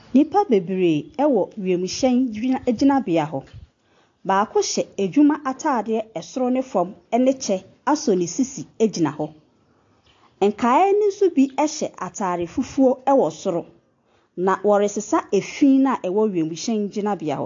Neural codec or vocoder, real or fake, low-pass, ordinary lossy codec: none; real; 7.2 kHz; AAC, 48 kbps